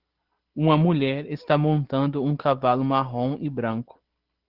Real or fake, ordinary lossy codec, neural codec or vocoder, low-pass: real; Opus, 16 kbps; none; 5.4 kHz